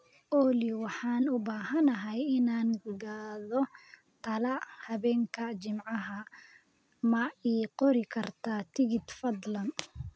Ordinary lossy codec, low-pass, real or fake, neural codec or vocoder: none; none; real; none